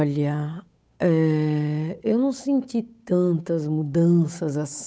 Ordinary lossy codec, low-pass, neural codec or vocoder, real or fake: none; none; codec, 16 kHz, 8 kbps, FunCodec, trained on Chinese and English, 25 frames a second; fake